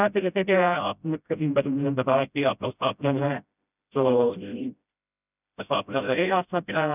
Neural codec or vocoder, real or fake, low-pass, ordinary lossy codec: codec, 16 kHz, 0.5 kbps, FreqCodec, smaller model; fake; 3.6 kHz; none